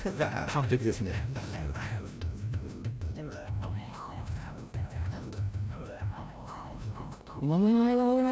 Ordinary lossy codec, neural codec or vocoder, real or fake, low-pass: none; codec, 16 kHz, 0.5 kbps, FreqCodec, larger model; fake; none